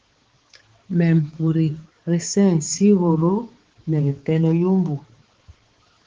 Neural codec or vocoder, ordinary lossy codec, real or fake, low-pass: codec, 16 kHz, 4 kbps, X-Codec, HuBERT features, trained on balanced general audio; Opus, 16 kbps; fake; 7.2 kHz